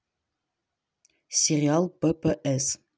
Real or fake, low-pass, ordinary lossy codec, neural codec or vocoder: real; none; none; none